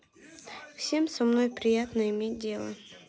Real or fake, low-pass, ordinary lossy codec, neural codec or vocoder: real; none; none; none